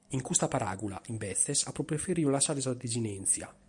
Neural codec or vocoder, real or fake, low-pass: none; real; 10.8 kHz